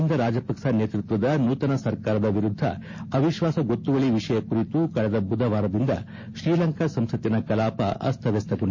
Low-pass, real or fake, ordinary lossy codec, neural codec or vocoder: 7.2 kHz; real; MP3, 32 kbps; none